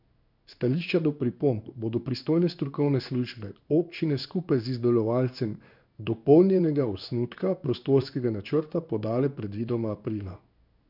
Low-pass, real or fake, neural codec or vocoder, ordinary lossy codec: 5.4 kHz; fake; codec, 16 kHz in and 24 kHz out, 1 kbps, XY-Tokenizer; none